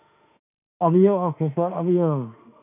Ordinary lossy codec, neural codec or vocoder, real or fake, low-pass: none; autoencoder, 48 kHz, 32 numbers a frame, DAC-VAE, trained on Japanese speech; fake; 3.6 kHz